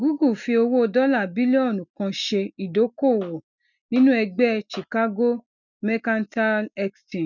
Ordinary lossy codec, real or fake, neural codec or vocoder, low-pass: none; real; none; 7.2 kHz